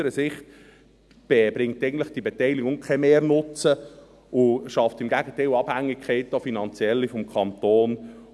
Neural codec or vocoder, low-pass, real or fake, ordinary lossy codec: none; none; real; none